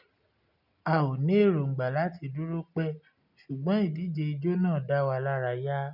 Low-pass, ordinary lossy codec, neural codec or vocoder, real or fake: 5.4 kHz; none; none; real